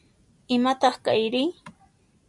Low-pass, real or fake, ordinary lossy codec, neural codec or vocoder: 10.8 kHz; real; MP3, 96 kbps; none